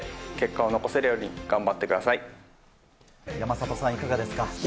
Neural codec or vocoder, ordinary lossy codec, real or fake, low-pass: none; none; real; none